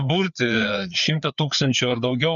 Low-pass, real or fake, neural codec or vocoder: 7.2 kHz; fake; codec, 16 kHz, 4 kbps, FreqCodec, larger model